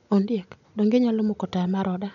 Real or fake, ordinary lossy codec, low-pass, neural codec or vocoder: real; none; 7.2 kHz; none